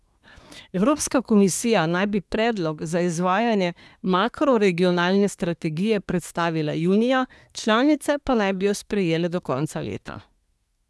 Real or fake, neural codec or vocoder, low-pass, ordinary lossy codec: fake; codec, 24 kHz, 1 kbps, SNAC; none; none